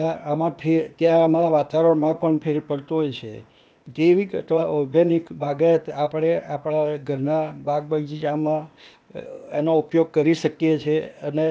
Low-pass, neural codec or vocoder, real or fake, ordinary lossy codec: none; codec, 16 kHz, 0.8 kbps, ZipCodec; fake; none